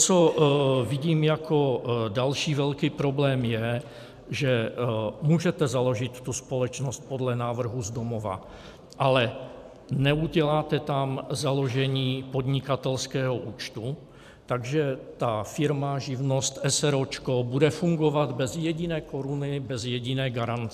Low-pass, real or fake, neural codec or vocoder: 14.4 kHz; fake; vocoder, 44.1 kHz, 128 mel bands every 512 samples, BigVGAN v2